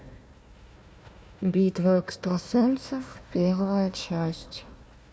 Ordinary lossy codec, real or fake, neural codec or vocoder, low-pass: none; fake; codec, 16 kHz, 1 kbps, FunCodec, trained on Chinese and English, 50 frames a second; none